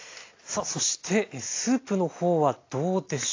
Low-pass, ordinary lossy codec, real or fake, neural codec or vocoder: 7.2 kHz; AAC, 32 kbps; real; none